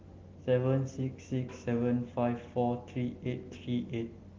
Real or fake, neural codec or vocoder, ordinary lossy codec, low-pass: real; none; Opus, 16 kbps; 7.2 kHz